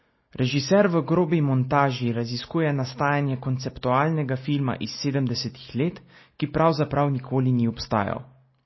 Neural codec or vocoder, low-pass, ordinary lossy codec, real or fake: none; 7.2 kHz; MP3, 24 kbps; real